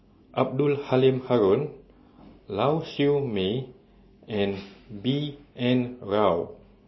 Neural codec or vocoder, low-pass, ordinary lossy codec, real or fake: none; 7.2 kHz; MP3, 24 kbps; real